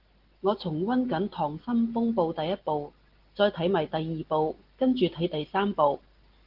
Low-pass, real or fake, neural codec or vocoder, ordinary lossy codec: 5.4 kHz; real; none; Opus, 16 kbps